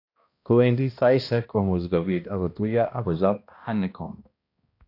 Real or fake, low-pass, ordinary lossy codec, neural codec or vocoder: fake; 5.4 kHz; AAC, 32 kbps; codec, 16 kHz, 1 kbps, X-Codec, HuBERT features, trained on balanced general audio